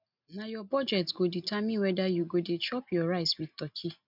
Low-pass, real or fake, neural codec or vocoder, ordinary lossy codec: 5.4 kHz; real; none; none